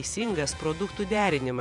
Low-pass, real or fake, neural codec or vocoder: 10.8 kHz; fake; vocoder, 48 kHz, 128 mel bands, Vocos